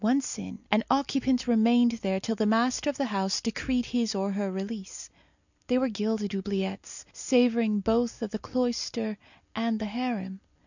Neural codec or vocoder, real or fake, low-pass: none; real; 7.2 kHz